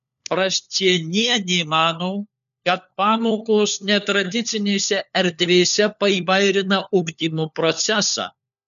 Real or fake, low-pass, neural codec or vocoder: fake; 7.2 kHz; codec, 16 kHz, 4 kbps, FunCodec, trained on LibriTTS, 50 frames a second